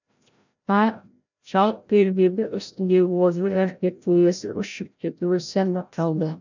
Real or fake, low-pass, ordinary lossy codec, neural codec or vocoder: fake; 7.2 kHz; none; codec, 16 kHz, 0.5 kbps, FreqCodec, larger model